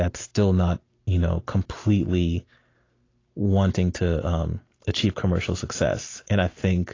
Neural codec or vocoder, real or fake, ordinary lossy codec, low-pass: none; real; AAC, 32 kbps; 7.2 kHz